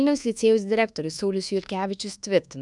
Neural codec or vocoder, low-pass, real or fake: codec, 24 kHz, 1.2 kbps, DualCodec; 10.8 kHz; fake